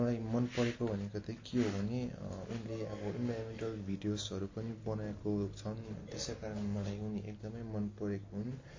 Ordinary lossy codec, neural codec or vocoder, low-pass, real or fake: MP3, 32 kbps; none; 7.2 kHz; real